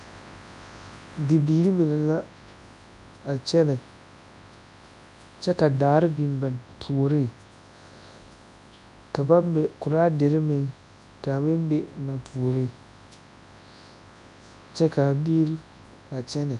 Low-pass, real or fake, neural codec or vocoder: 10.8 kHz; fake; codec, 24 kHz, 0.9 kbps, WavTokenizer, large speech release